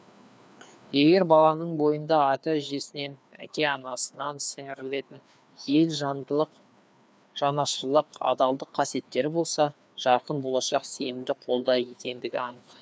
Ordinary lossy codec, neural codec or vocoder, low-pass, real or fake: none; codec, 16 kHz, 2 kbps, FreqCodec, larger model; none; fake